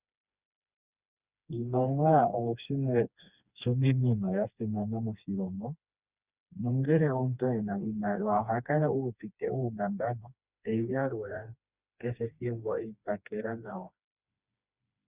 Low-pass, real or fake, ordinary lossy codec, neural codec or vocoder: 3.6 kHz; fake; Opus, 64 kbps; codec, 16 kHz, 2 kbps, FreqCodec, smaller model